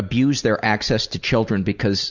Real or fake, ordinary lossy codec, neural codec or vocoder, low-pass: real; Opus, 64 kbps; none; 7.2 kHz